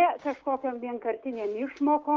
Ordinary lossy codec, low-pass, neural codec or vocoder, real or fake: Opus, 32 kbps; 7.2 kHz; codec, 16 kHz, 6 kbps, DAC; fake